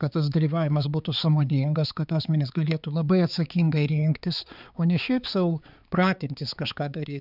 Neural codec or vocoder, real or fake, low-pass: codec, 16 kHz, 4 kbps, X-Codec, HuBERT features, trained on balanced general audio; fake; 5.4 kHz